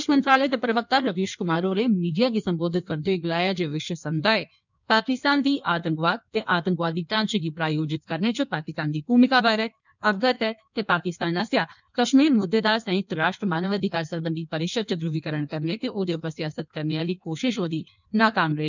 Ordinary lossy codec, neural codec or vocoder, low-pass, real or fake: none; codec, 16 kHz in and 24 kHz out, 1.1 kbps, FireRedTTS-2 codec; 7.2 kHz; fake